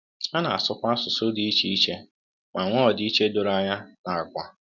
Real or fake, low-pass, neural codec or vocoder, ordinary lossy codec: real; 7.2 kHz; none; none